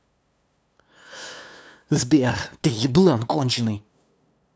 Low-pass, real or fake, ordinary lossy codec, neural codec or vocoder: none; fake; none; codec, 16 kHz, 2 kbps, FunCodec, trained on LibriTTS, 25 frames a second